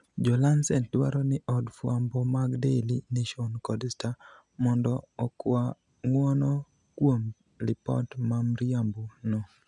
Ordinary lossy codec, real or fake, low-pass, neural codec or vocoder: none; real; 10.8 kHz; none